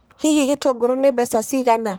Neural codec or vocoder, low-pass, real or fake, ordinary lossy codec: codec, 44.1 kHz, 1.7 kbps, Pupu-Codec; none; fake; none